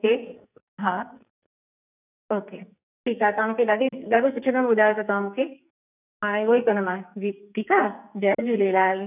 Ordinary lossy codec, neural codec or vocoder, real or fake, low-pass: none; codec, 44.1 kHz, 2.6 kbps, SNAC; fake; 3.6 kHz